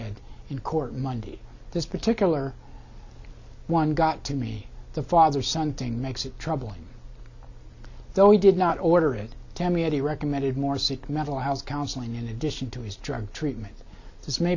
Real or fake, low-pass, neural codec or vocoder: real; 7.2 kHz; none